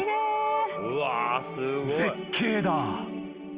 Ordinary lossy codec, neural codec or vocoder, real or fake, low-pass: Opus, 32 kbps; none; real; 3.6 kHz